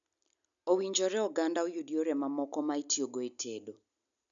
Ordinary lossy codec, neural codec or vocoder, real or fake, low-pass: none; none; real; 7.2 kHz